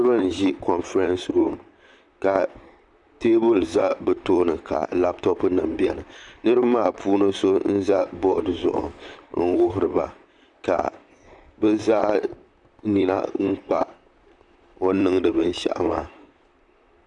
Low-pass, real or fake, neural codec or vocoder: 10.8 kHz; fake; vocoder, 44.1 kHz, 128 mel bands, Pupu-Vocoder